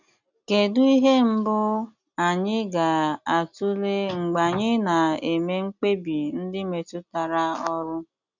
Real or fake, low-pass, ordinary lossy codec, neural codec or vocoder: real; 7.2 kHz; none; none